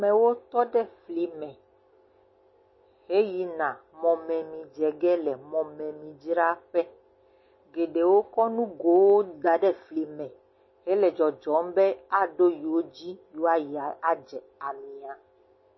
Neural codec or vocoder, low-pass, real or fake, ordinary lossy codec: none; 7.2 kHz; real; MP3, 24 kbps